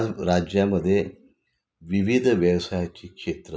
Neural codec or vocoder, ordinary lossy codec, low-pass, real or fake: none; none; none; real